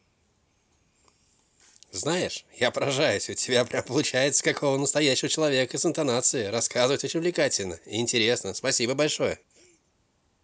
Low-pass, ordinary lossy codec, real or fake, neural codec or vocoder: none; none; real; none